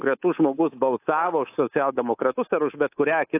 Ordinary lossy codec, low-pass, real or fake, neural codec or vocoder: AAC, 24 kbps; 3.6 kHz; fake; codec, 24 kHz, 3.1 kbps, DualCodec